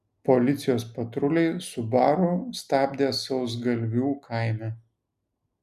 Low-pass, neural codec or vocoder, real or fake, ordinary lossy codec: 14.4 kHz; none; real; MP3, 96 kbps